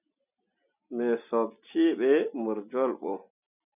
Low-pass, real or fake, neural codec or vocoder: 3.6 kHz; real; none